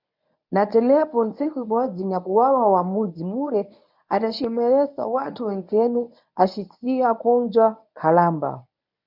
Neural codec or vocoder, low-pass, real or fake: codec, 24 kHz, 0.9 kbps, WavTokenizer, medium speech release version 1; 5.4 kHz; fake